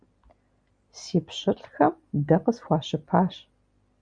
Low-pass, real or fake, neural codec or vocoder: 9.9 kHz; real; none